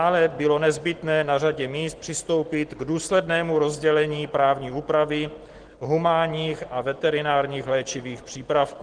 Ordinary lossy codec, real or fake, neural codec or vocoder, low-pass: Opus, 16 kbps; real; none; 9.9 kHz